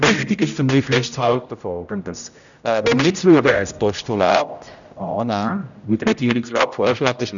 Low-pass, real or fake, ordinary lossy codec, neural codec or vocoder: 7.2 kHz; fake; none; codec, 16 kHz, 0.5 kbps, X-Codec, HuBERT features, trained on general audio